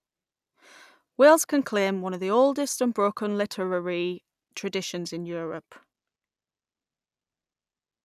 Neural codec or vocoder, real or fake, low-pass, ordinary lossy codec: none; real; 14.4 kHz; none